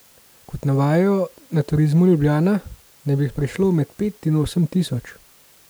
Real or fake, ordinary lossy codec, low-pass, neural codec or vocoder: real; none; none; none